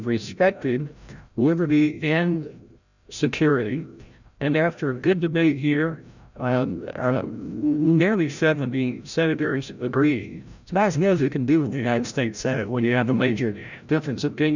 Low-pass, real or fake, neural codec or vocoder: 7.2 kHz; fake; codec, 16 kHz, 0.5 kbps, FreqCodec, larger model